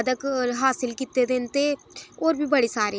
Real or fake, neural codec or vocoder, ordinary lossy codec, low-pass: real; none; none; none